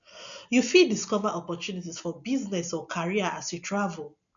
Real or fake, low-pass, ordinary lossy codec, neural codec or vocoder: real; 7.2 kHz; none; none